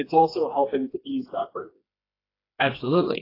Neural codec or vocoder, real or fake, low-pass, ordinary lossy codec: codec, 16 kHz, 2 kbps, FreqCodec, smaller model; fake; 5.4 kHz; AAC, 24 kbps